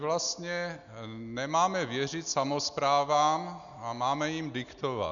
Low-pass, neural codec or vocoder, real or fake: 7.2 kHz; none; real